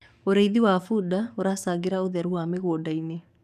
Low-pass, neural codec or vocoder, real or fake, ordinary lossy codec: 14.4 kHz; codec, 44.1 kHz, 7.8 kbps, DAC; fake; none